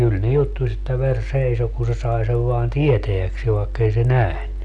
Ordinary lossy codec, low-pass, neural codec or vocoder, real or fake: none; 10.8 kHz; none; real